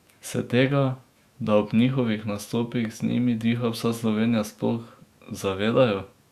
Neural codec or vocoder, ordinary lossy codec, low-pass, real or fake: autoencoder, 48 kHz, 128 numbers a frame, DAC-VAE, trained on Japanese speech; Opus, 64 kbps; 14.4 kHz; fake